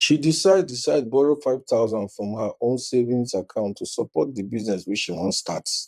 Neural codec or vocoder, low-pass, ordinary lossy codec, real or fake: vocoder, 44.1 kHz, 128 mel bands, Pupu-Vocoder; 14.4 kHz; none; fake